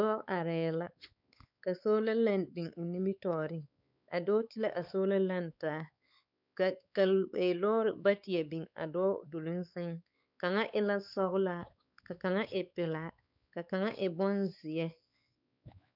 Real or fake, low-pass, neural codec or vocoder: fake; 5.4 kHz; codec, 16 kHz, 4 kbps, X-Codec, WavLM features, trained on Multilingual LibriSpeech